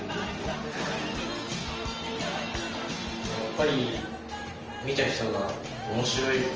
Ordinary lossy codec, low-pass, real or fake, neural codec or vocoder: Opus, 24 kbps; 7.2 kHz; real; none